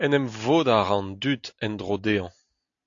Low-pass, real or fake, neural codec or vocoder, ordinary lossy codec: 7.2 kHz; real; none; AAC, 48 kbps